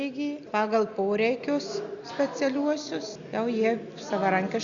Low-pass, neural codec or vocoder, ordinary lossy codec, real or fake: 7.2 kHz; none; Opus, 64 kbps; real